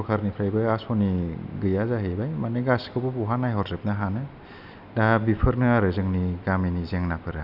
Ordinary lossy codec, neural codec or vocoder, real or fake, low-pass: none; none; real; 5.4 kHz